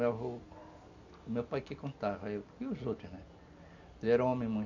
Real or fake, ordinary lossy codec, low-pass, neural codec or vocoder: real; none; 7.2 kHz; none